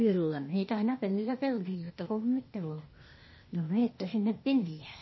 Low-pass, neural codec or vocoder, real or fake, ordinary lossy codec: 7.2 kHz; codec, 16 kHz, 0.8 kbps, ZipCodec; fake; MP3, 24 kbps